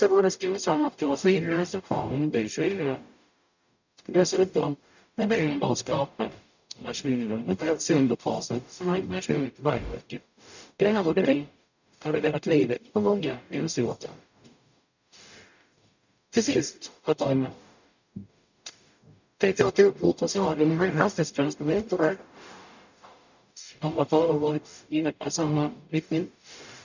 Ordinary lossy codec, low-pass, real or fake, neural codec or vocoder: none; 7.2 kHz; fake; codec, 44.1 kHz, 0.9 kbps, DAC